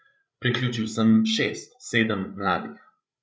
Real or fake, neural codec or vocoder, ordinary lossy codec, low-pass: fake; codec, 16 kHz, 16 kbps, FreqCodec, larger model; none; none